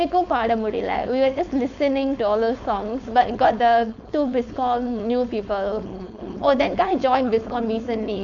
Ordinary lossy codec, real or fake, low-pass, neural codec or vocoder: AAC, 64 kbps; fake; 7.2 kHz; codec, 16 kHz, 4.8 kbps, FACodec